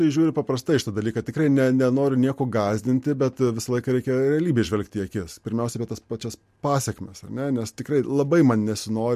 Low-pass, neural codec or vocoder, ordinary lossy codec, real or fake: 14.4 kHz; none; MP3, 64 kbps; real